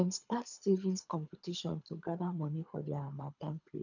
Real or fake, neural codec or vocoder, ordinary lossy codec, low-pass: fake; codec, 24 kHz, 3 kbps, HILCodec; none; 7.2 kHz